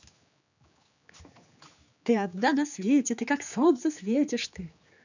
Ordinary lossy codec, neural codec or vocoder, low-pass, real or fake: none; codec, 16 kHz, 4 kbps, X-Codec, HuBERT features, trained on general audio; 7.2 kHz; fake